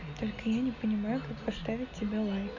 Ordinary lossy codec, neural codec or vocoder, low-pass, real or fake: Opus, 64 kbps; none; 7.2 kHz; real